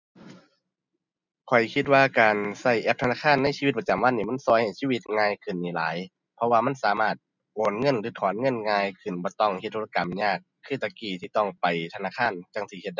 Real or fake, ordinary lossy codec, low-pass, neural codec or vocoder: real; none; 7.2 kHz; none